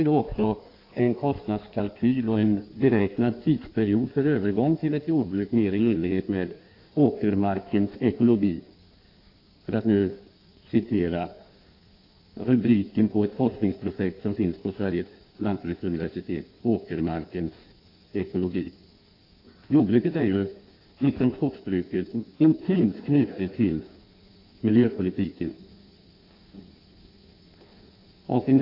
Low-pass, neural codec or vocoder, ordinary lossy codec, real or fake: 5.4 kHz; codec, 16 kHz in and 24 kHz out, 1.1 kbps, FireRedTTS-2 codec; AAC, 32 kbps; fake